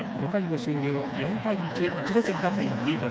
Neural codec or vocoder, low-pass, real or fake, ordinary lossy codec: codec, 16 kHz, 2 kbps, FreqCodec, smaller model; none; fake; none